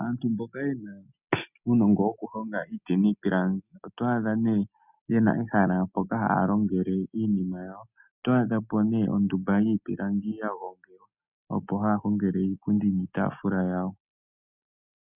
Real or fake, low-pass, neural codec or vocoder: real; 3.6 kHz; none